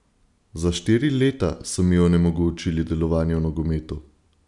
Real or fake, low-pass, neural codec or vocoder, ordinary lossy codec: real; 10.8 kHz; none; none